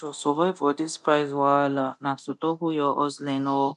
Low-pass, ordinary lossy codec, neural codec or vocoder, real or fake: 10.8 kHz; none; codec, 24 kHz, 0.9 kbps, DualCodec; fake